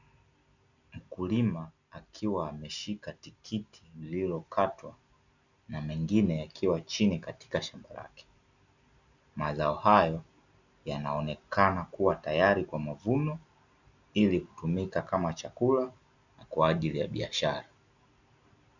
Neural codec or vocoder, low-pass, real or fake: none; 7.2 kHz; real